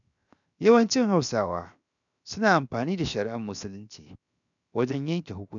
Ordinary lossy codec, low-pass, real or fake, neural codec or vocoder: none; 7.2 kHz; fake; codec, 16 kHz, 0.7 kbps, FocalCodec